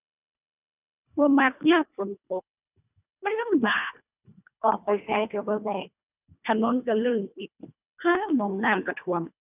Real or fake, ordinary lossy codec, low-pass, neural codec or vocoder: fake; none; 3.6 kHz; codec, 24 kHz, 1.5 kbps, HILCodec